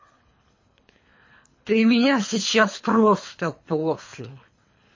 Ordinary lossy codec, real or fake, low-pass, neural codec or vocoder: MP3, 32 kbps; fake; 7.2 kHz; codec, 24 kHz, 3 kbps, HILCodec